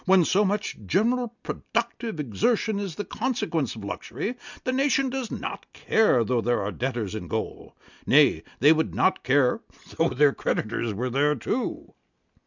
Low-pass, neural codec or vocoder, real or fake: 7.2 kHz; none; real